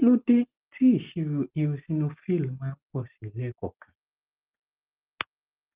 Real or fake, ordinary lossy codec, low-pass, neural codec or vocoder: real; Opus, 32 kbps; 3.6 kHz; none